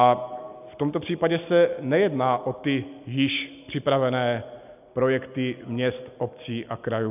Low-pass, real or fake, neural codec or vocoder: 3.6 kHz; real; none